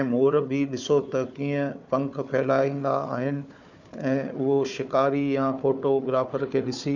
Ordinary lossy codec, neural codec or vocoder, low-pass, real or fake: none; codec, 16 kHz, 4 kbps, FunCodec, trained on Chinese and English, 50 frames a second; 7.2 kHz; fake